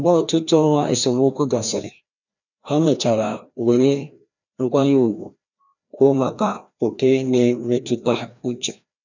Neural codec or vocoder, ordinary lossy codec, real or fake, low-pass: codec, 16 kHz, 1 kbps, FreqCodec, larger model; none; fake; 7.2 kHz